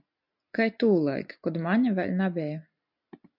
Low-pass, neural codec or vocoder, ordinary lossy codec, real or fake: 5.4 kHz; none; MP3, 48 kbps; real